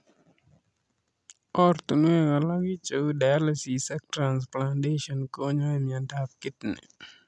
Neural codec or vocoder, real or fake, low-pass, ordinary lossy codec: none; real; none; none